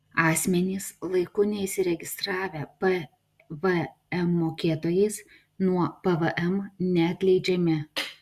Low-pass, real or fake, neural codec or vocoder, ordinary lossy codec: 14.4 kHz; real; none; Opus, 64 kbps